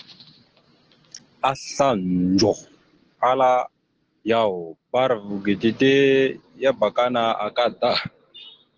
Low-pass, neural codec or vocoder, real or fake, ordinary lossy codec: 7.2 kHz; none; real; Opus, 16 kbps